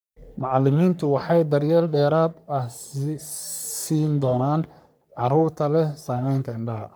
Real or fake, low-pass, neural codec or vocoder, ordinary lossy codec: fake; none; codec, 44.1 kHz, 3.4 kbps, Pupu-Codec; none